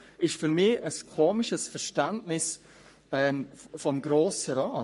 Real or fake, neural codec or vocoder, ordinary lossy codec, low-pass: fake; codec, 44.1 kHz, 3.4 kbps, Pupu-Codec; MP3, 48 kbps; 14.4 kHz